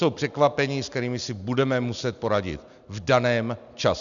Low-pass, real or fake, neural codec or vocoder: 7.2 kHz; real; none